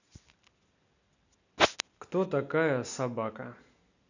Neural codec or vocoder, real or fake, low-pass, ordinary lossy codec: none; real; 7.2 kHz; none